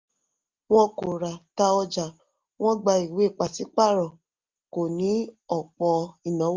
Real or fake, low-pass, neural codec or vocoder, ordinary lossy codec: real; 7.2 kHz; none; Opus, 24 kbps